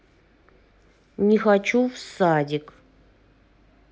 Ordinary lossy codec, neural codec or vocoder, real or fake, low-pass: none; none; real; none